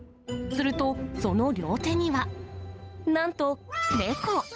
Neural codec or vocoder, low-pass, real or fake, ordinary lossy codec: codec, 16 kHz, 8 kbps, FunCodec, trained on Chinese and English, 25 frames a second; none; fake; none